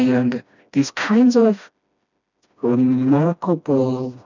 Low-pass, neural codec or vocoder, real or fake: 7.2 kHz; codec, 16 kHz, 1 kbps, FreqCodec, smaller model; fake